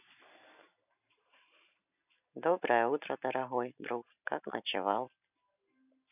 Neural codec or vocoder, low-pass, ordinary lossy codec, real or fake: none; 3.6 kHz; none; real